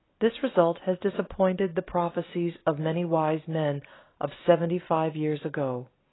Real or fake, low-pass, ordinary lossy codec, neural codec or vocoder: real; 7.2 kHz; AAC, 16 kbps; none